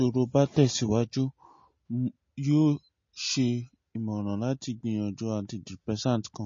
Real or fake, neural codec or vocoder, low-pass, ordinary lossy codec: real; none; 7.2 kHz; MP3, 32 kbps